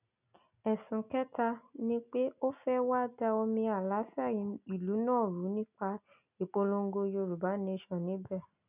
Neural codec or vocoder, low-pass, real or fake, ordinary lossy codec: none; 3.6 kHz; real; none